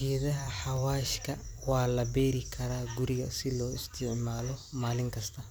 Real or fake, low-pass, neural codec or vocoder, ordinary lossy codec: fake; none; vocoder, 44.1 kHz, 128 mel bands every 256 samples, BigVGAN v2; none